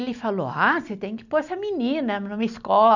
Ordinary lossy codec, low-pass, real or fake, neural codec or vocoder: none; 7.2 kHz; real; none